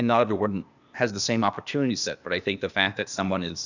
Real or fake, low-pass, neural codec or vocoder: fake; 7.2 kHz; codec, 16 kHz, 0.8 kbps, ZipCodec